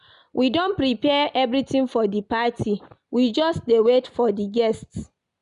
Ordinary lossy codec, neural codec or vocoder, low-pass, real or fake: none; none; 10.8 kHz; real